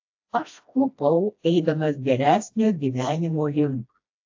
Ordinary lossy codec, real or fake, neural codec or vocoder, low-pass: AAC, 48 kbps; fake; codec, 16 kHz, 1 kbps, FreqCodec, smaller model; 7.2 kHz